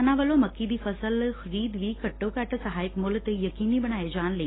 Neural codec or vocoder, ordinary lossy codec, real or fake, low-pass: none; AAC, 16 kbps; real; 7.2 kHz